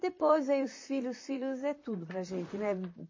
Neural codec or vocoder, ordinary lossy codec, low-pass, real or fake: vocoder, 44.1 kHz, 128 mel bands, Pupu-Vocoder; MP3, 32 kbps; 7.2 kHz; fake